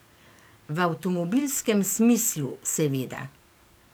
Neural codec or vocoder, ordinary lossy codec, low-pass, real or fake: codec, 44.1 kHz, 7.8 kbps, DAC; none; none; fake